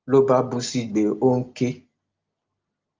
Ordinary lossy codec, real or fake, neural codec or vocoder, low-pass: Opus, 24 kbps; real; none; 7.2 kHz